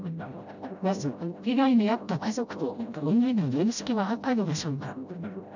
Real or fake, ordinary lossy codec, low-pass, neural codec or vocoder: fake; none; 7.2 kHz; codec, 16 kHz, 0.5 kbps, FreqCodec, smaller model